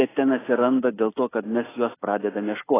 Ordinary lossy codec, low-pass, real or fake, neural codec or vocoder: AAC, 16 kbps; 3.6 kHz; real; none